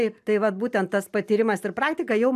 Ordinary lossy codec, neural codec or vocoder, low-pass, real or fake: AAC, 96 kbps; none; 14.4 kHz; real